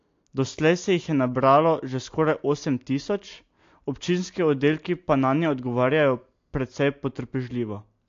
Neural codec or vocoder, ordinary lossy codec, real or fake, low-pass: none; AAC, 48 kbps; real; 7.2 kHz